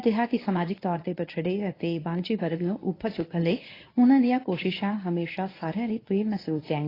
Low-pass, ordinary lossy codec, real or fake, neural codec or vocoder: 5.4 kHz; AAC, 24 kbps; fake; codec, 24 kHz, 0.9 kbps, WavTokenizer, medium speech release version 1